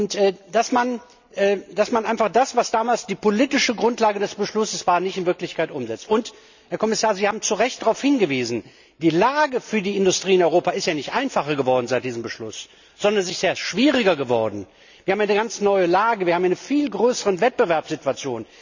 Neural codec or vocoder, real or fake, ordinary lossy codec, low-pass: none; real; none; 7.2 kHz